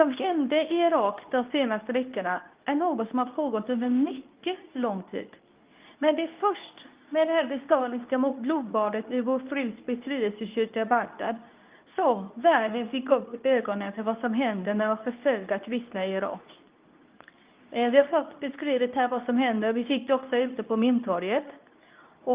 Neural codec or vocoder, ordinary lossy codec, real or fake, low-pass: codec, 24 kHz, 0.9 kbps, WavTokenizer, medium speech release version 2; Opus, 24 kbps; fake; 3.6 kHz